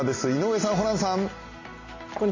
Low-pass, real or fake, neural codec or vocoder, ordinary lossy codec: 7.2 kHz; real; none; MP3, 32 kbps